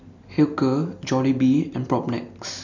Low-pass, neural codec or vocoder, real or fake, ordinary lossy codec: 7.2 kHz; none; real; none